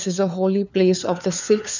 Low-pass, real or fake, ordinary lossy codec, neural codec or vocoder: 7.2 kHz; fake; none; codec, 16 kHz, 4 kbps, FunCodec, trained on LibriTTS, 50 frames a second